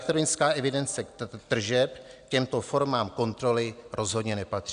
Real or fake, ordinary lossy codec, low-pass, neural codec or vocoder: real; Opus, 64 kbps; 9.9 kHz; none